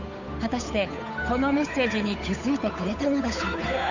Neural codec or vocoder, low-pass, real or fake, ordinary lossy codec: codec, 16 kHz, 8 kbps, FunCodec, trained on Chinese and English, 25 frames a second; 7.2 kHz; fake; none